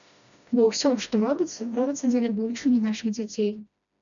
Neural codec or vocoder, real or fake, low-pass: codec, 16 kHz, 1 kbps, FreqCodec, smaller model; fake; 7.2 kHz